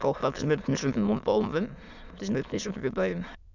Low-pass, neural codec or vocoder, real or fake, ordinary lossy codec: 7.2 kHz; autoencoder, 22.05 kHz, a latent of 192 numbers a frame, VITS, trained on many speakers; fake; none